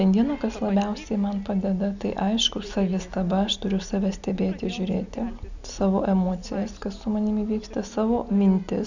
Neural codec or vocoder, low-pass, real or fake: none; 7.2 kHz; real